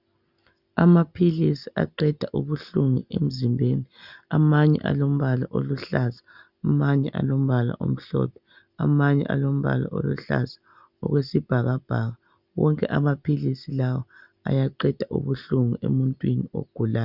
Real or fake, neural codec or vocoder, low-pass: real; none; 5.4 kHz